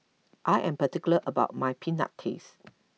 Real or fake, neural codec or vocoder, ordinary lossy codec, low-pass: real; none; none; none